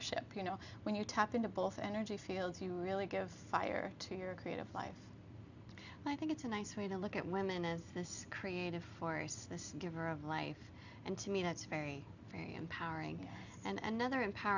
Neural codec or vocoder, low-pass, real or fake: none; 7.2 kHz; real